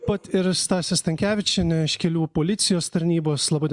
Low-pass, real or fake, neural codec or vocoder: 10.8 kHz; real; none